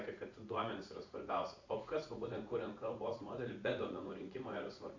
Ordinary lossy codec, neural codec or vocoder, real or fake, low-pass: MP3, 64 kbps; vocoder, 44.1 kHz, 80 mel bands, Vocos; fake; 7.2 kHz